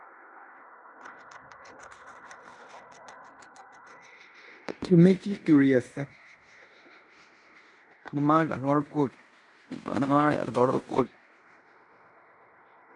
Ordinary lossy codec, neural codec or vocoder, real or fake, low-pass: MP3, 96 kbps; codec, 16 kHz in and 24 kHz out, 0.9 kbps, LongCat-Audio-Codec, fine tuned four codebook decoder; fake; 10.8 kHz